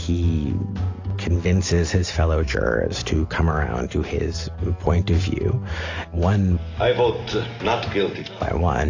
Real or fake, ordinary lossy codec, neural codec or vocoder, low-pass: real; AAC, 48 kbps; none; 7.2 kHz